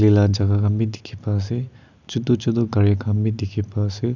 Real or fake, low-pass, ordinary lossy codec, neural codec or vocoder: real; 7.2 kHz; none; none